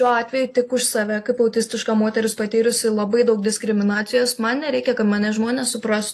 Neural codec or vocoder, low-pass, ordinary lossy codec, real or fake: none; 14.4 kHz; AAC, 48 kbps; real